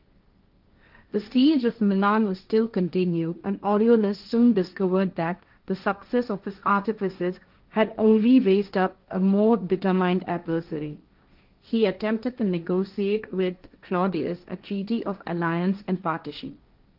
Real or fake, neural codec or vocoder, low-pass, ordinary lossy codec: fake; codec, 16 kHz, 1.1 kbps, Voila-Tokenizer; 5.4 kHz; Opus, 24 kbps